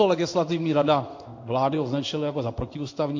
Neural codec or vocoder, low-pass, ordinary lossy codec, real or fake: codec, 16 kHz in and 24 kHz out, 1 kbps, XY-Tokenizer; 7.2 kHz; MP3, 48 kbps; fake